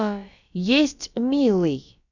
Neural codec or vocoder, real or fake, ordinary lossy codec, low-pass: codec, 16 kHz, about 1 kbps, DyCAST, with the encoder's durations; fake; none; 7.2 kHz